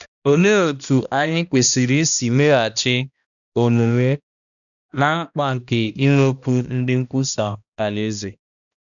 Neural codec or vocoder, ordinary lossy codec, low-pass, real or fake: codec, 16 kHz, 1 kbps, X-Codec, HuBERT features, trained on balanced general audio; none; 7.2 kHz; fake